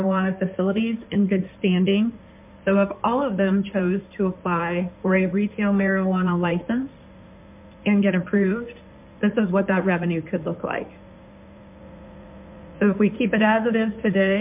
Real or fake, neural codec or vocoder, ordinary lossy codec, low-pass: fake; codec, 16 kHz in and 24 kHz out, 2.2 kbps, FireRedTTS-2 codec; MP3, 32 kbps; 3.6 kHz